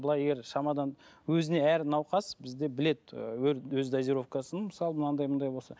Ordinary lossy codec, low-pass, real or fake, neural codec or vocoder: none; none; real; none